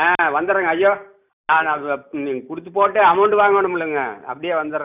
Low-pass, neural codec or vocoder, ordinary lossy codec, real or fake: 3.6 kHz; none; none; real